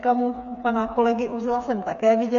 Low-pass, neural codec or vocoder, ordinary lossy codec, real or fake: 7.2 kHz; codec, 16 kHz, 4 kbps, FreqCodec, smaller model; AAC, 96 kbps; fake